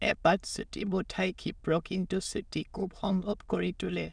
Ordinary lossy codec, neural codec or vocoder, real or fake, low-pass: none; autoencoder, 22.05 kHz, a latent of 192 numbers a frame, VITS, trained on many speakers; fake; 9.9 kHz